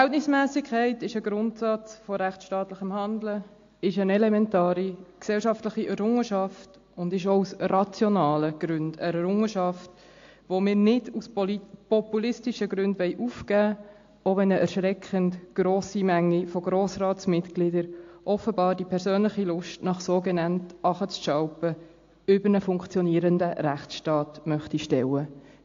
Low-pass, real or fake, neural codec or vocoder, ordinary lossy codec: 7.2 kHz; real; none; AAC, 48 kbps